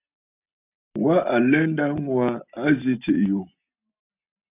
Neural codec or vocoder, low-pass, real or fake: none; 3.6 kHz; real